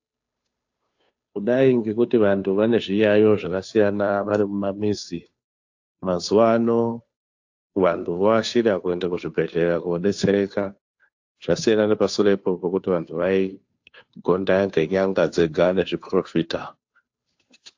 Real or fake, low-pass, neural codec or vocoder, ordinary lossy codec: fake; 7.2 kHz; codec, 16 kHz, 2 kbps, FunCodec, trained on Chinese and English, 25 frames a second; AAC, 48 kbps